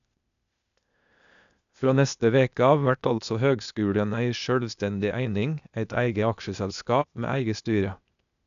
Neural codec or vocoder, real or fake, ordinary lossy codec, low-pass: codec, 16 kHz, 0.8 kbps, ZipCodec; fake; none; 7.2 kHz